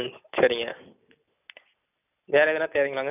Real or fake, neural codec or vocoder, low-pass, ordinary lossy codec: real; none; 3.6 kHz; none